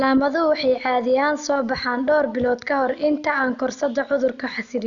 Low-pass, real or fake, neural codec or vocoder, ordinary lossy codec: 7.2 kHz; real; none; none